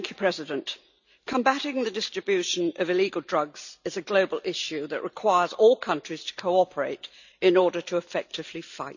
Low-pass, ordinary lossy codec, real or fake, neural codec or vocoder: 7.2 kHz; none; real; none